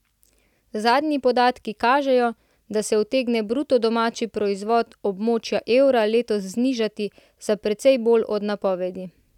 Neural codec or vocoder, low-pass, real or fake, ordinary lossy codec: none; 19.8 kHz; real; none